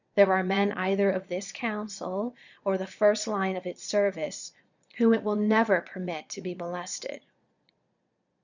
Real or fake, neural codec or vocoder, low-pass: fake; vocoder, 22.05 kHz, 80 mel bands, Vocos; 7.2 kHz